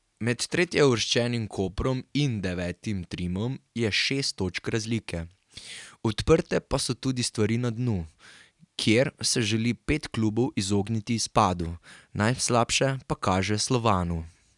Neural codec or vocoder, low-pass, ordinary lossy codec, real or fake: none; 10.8 kHz; none; real